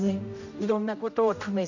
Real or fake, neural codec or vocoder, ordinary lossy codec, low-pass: fake; codec, 16 kHz, 0.5 kbps, X-Codec, HuBERT features, trained on general audio; none; 7.2 kHz